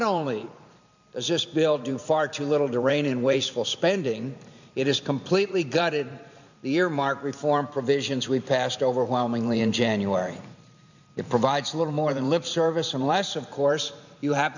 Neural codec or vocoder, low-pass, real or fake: vocoder, 44.1 kHz, 128 mel bands every 512 samples, BigVGAN v2; 7.2 kHz; fake